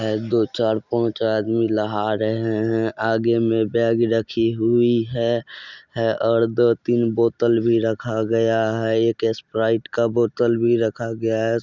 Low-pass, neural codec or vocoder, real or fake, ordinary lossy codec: 7.2 kHz; none; real; Opus, 64 kbps